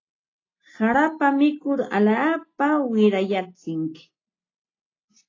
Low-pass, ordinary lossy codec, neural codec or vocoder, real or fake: 7.2 kHz; AAC, 32 kbps; none; real